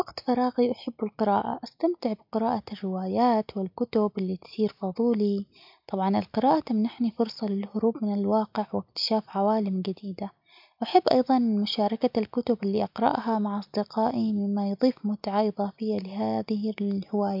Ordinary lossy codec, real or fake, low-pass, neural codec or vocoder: MP3, 48 kbps; real; 5.4 kHz; none